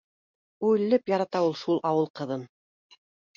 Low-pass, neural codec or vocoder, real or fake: 7.2 kHz; none; real